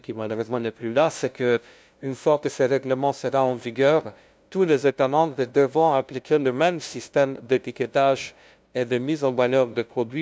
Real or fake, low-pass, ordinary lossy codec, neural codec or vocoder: fake; none; none; codec, 16 kHz, 0.5 kbps, FunCodec, trained on LibriTTS, 25 frames a second